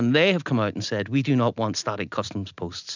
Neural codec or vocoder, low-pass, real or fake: none; 7.2 kHz; real